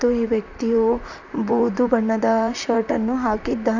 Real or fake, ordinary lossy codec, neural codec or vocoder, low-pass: fake; none; vocoder, 44.1 kHz, 128 mel bands, Pupu-Vocoder; 7.2 kHz